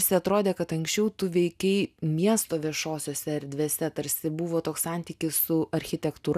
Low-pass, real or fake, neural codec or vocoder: 14.4 kHz; real; none